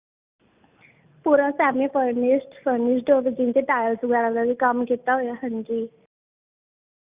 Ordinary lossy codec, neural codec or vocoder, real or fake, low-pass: Opus, 64 kbps; none; real; 3.6 kHz